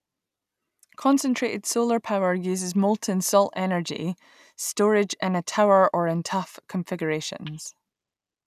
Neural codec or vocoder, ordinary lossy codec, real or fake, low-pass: none; none; real; 14.4 kHz